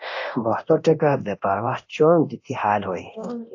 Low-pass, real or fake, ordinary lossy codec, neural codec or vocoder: 7.2 kHz; fake; AAC, 48 kbps; codec, 24 kHz, 0.9 kbps, DualCodec